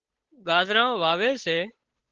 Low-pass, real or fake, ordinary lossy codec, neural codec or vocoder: 7.2 kHz; fake; Opus, 16 kbps; codec, 16 kHz, 8 kbps, FunCodec, trained on Chinese and English, 25 frames a second